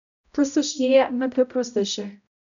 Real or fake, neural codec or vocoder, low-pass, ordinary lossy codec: fake; codec, 16 kHz, 0.5 kbps, X-Codec, HuBERT features, trained on balanced general audio; 7.2 kHz; none